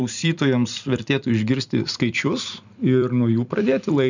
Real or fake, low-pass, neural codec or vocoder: fake; 7.2 kHz; vocoder, 44.1 kHz, 128 mel bands every 256 samples, BigVGAN v2